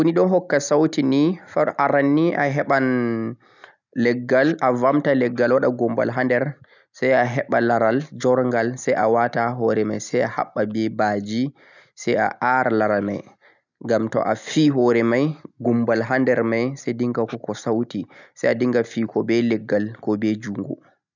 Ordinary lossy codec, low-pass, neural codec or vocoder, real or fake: none; 7.2 kHz; none; real